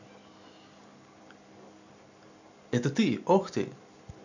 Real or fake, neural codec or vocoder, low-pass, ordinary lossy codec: real; none; 7.2 kHz; none